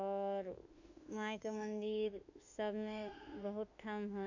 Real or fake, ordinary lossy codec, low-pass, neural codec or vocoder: fake; none; 7.2 kHz; autoencoder, 48 kHz, 32 numbers a frame, DAC-VAE, trained on Japanese speech